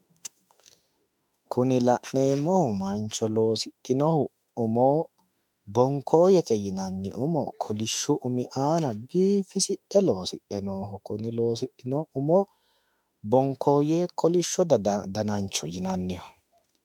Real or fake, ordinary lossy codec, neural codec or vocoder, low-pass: fake; MP3, 96 kbps; autoencoder, 48 kHz, 32 numbers a frame, DAC-VAE, trained on Japanese speech; 19.8 kHz